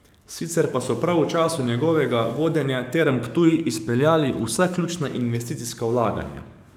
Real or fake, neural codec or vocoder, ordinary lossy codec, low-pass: fake; codec, 44.1 kHz, 7.8 kbps, DAC; none; 19.8 kHz